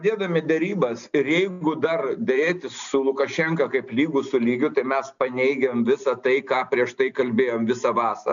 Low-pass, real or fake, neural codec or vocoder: 7.2 kHz; real; none